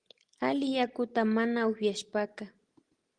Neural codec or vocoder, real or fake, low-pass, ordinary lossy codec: none; real; 9.9 kHz; Opus, 24 kbps